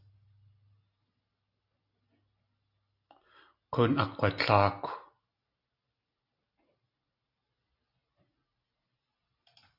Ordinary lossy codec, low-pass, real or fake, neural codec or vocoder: AAC, 48 kbps; 5.4 kHz; real; none